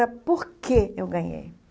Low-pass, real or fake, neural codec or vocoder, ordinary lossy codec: none; real; none; none